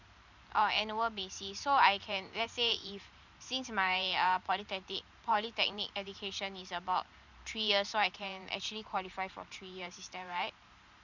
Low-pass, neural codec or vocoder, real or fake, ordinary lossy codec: 7.2 kHz; vocoder, 44.1 kHz, 128 mel bands every 512 samples, BigVGAN v2; fake; none